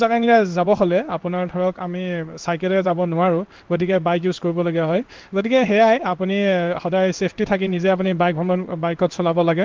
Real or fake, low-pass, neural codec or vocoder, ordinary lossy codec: fake; 7.2 kHz; codec, 16 kHz in and 24 kHz out, 1 kbps, XY-Tokenizer; Opus, 24 kbps